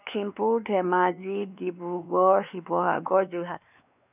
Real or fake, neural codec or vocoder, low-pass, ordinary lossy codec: fake; codec, 16 kHz, 2 kbps, X-Codec, WavLM features, trained on Multilingual LibriSpeech; 3.6 kHz; none